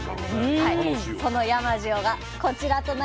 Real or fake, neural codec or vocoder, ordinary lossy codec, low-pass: real; none; none; none